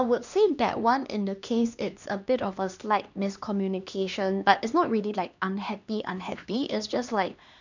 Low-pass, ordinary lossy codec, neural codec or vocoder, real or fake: 7.2 kHz; none; codec, 16 kHz, 2 kbps, X-Codec, HuBERT features, trained on LibriSpeech; fake